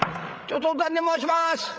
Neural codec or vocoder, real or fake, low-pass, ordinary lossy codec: codec, 16 kHz, 16 kbps, FreqCodec, larger model; fake; none; none